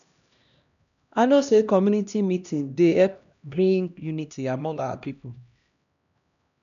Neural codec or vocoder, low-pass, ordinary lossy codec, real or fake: codec, 16 kHz, 1 kbps, X-Codec, HuBERT features, trained on LibriSpeech; 7.2 kHz; none; fake